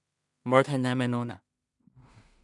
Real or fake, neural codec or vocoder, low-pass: fake; codec, 16 kHz in and 24 kHz out, 0.4 kbps, LongCat-Audio-Codec, two codebook decoder; 10.8 kHz